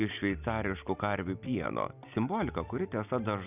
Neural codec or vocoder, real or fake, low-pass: none; real; 3.6 kHz